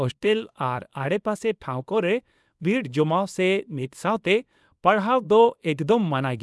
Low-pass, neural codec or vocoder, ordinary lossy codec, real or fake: none; codec, 24 kHz, 0.9 kbps, WavTokenizer, small release; none; fake